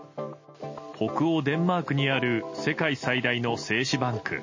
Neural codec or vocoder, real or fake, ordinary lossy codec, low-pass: none; real; MP3, 32 kbps; 7.2 kHz